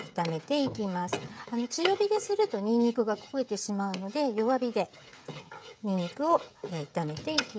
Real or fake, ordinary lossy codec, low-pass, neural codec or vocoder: fake; none; none; codec, 16 kHz, 16 kbps, FreqCodec, smaller model